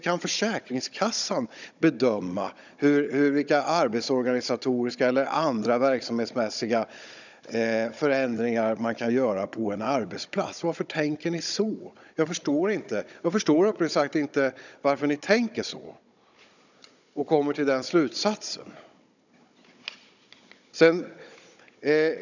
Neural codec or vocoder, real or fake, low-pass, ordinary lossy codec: codec, 16 kHz, 16 kbps, FunCodec, trained on Chinese and English, 50 frames a second; fake; 7.2 kHz; none